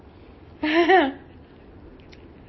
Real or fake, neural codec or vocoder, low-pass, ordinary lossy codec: real; none; 7.2 kHz; MP3, 24 kbps